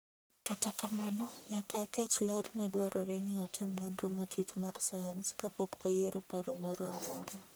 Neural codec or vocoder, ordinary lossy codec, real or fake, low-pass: codec, 44.1 kHz, 1.7 kbps, Pupu-Codec; none; fake; none